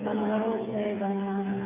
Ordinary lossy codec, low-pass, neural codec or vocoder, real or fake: MP3, 32 kbps; 3.6 kHz; codec, 16 kHz, 8 kbps, FreqCodec, smaller model; fake